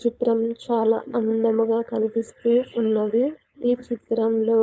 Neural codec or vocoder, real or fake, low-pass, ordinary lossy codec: codec, 16 kHz, 4.8 kbps, FACodec; fake; none; none